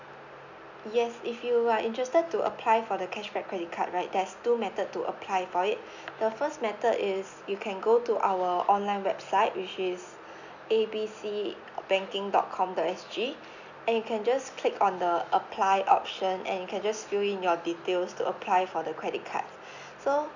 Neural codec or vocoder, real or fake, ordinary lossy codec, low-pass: none; real; none; 7.2 kHz